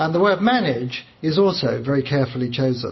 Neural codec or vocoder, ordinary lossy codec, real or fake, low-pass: none; MP3, 24 kbps; real; 7.2 kHz